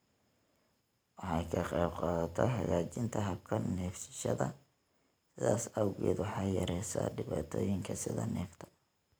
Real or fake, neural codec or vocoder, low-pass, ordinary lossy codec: fake; vocoder, 44.1 kHz, 128 mel bands every 512 samples, BigVGAN v2; none; none